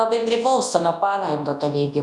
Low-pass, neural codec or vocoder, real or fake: 10.8 kHz; codec, 24 kHz, 0.9 kbps, WavTokenizer, large speech release; fake